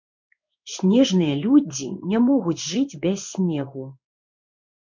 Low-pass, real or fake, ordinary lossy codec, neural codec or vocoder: 7.2 kHz; fake; MP3, 64 kbps; codec, 16 kHz in and 24 kHz out, 1 kbps, XY-Tokenizer